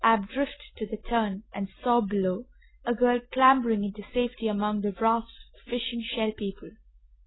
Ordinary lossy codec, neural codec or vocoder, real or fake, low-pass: AAC, 16 kbps; none; real; 7.2 kHz